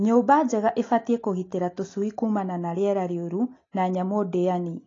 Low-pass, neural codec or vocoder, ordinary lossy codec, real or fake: 7.2 kHz; none; AAC, 32 kbps; real